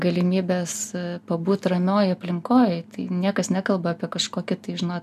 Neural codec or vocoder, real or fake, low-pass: none; real; 14.4 kHz